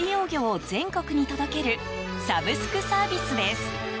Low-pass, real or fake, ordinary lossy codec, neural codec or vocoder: none; real; none; none